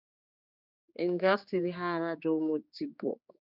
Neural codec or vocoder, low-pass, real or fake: codec, 16 kHz, 2 kbps, X-Codec, HuBERT features, trained on general audio; 5.4 kHz; fake